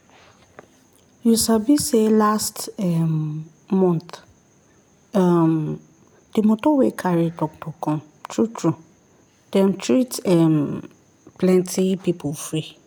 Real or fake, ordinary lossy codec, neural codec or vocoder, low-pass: real; none; none; none